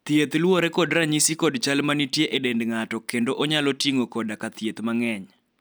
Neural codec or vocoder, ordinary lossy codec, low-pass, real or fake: none; none; none; real